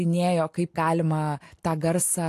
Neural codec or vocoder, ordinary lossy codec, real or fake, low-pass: none; AAC, 64 kbps; real; 14.4 kHz